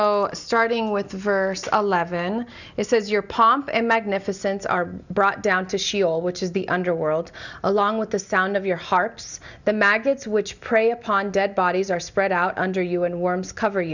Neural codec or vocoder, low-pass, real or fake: none; 7.2 kHz; real